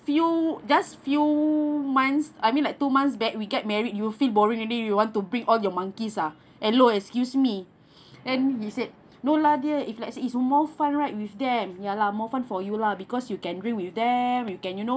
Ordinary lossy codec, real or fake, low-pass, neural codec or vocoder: none; real; none; none